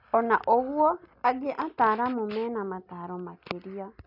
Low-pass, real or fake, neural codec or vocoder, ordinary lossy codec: 5.4 kHz; real; none; none